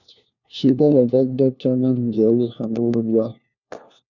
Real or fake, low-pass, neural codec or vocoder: fake; 7.2 kHz; codec, 16 kHz, 1 kbps, FunCodec, trained on LibriTTS, 50 frames a second